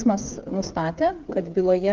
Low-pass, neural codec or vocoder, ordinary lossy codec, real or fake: 7.2 kHz; codec, 16 kHz, 8 kbps, FreqCodec, smaller model; Opus, 24 kbps; fake